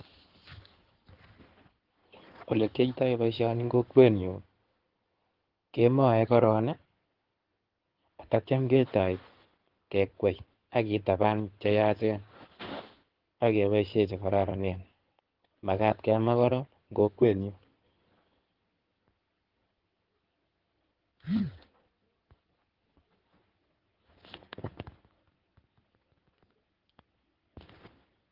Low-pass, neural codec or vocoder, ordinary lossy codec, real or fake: 5.4 kHz; codec, 16 kHz in and 24 kHz out, 2.2 kbps, FireRedTTS-2 codec; Opus, 16 kbps; fake